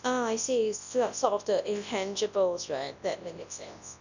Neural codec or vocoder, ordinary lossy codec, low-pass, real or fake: codec, 24 kHz, 0.9 kbps, WavTokenizer, large speech release; none; 7.2 kHz; fake